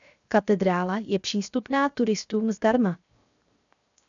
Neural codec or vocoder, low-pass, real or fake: codec, 16 kHz, 0.7 kbps, FocalCodec; 7.2 kHz; fake